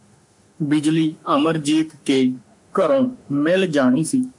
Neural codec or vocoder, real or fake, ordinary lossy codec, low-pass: autoencoder, 48 kHz, 32 numbers a frame, DAC-VAE, trained on Japanese speech; fake; MP3, 64 kbps; 10.8 kHz